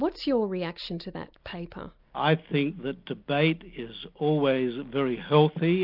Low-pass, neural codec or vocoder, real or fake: 5.4 kHz; none; real